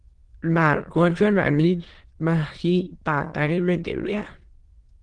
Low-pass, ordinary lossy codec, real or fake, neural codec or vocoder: 9.9 kHz; Opus, 16 kbps; fake; autoencoder, 22.05 kHz, a latent of 192 numbers a frame, VITS, trained on many speakers